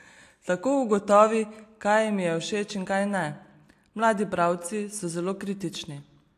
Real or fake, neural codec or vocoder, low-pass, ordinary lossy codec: real; none; 14.4 kHz; AAC, 64 kbps